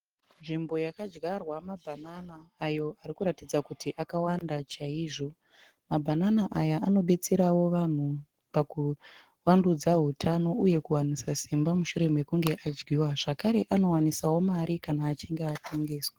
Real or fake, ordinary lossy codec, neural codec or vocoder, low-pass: fake; Opus, 16 kbps; codec, 44.1 kHz, 7.8 kbps, Pupu-Codec; 19.8 kHz